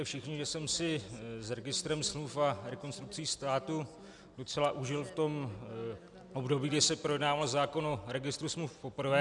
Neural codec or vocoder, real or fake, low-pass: none; real; 10.8 kHz